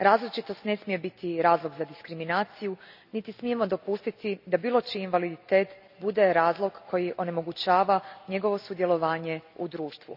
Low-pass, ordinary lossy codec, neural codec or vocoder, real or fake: 5.4 kHz; none; none; real